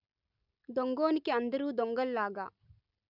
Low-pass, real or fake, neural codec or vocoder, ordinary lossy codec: 5.4 kHz; real; none; none